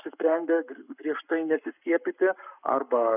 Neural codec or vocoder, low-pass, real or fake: codec, 44.1 kHz, 7.8 kbps, Pupu-Codec; 3.6 kHz; fake